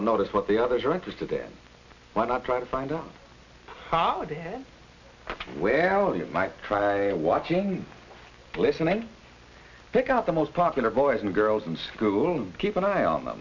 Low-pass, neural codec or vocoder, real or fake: 7.2 kHz; none; real